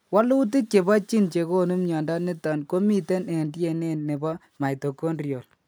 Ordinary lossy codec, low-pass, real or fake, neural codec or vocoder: none; none; real; none